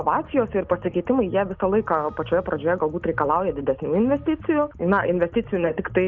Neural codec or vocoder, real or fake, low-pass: none; real; 7.2 kHz